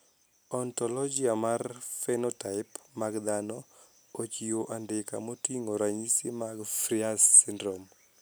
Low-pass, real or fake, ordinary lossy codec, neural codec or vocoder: none; real; none; none